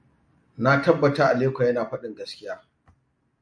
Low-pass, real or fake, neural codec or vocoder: 9.9 kHz; real; none